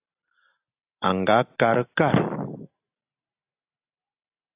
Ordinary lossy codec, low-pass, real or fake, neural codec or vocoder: AAC, 24 kbps; 3.6 kHz; real; none